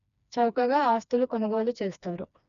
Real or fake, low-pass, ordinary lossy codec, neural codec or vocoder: fake; 7.2 kHz; none; codec, 16 kHz, 2 kbps, FreqCodec, smaller model